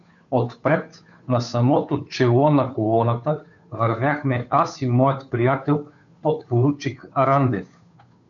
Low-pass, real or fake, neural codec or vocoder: 7.2 kHz; fake; codec, 16 kHz, 2 kbps, FunCodec, trained on Chinese and English, 25 frames a second